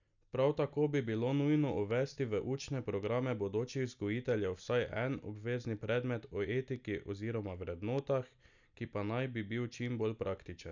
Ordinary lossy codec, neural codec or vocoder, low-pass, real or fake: none; none; 7.2 kHz; real